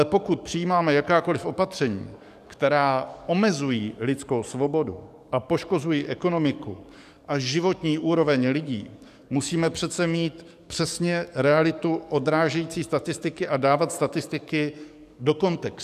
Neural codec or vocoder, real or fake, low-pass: codec, 44.1 kHz, 7.8 kbps, DAC; fake; 14.4 kHz